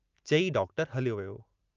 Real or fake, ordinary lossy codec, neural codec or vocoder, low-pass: real; Opus, 24 kbps; none; 7.2 kHz